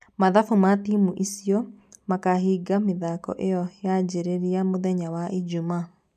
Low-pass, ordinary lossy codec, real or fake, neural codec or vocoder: 14.4 kHz; none; real; none